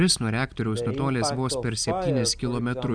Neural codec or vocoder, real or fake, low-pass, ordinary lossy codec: none; real; 9.9 kHz; Opus, 64 kbps